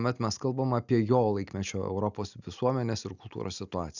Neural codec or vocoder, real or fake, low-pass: none; real; 7.2 kHz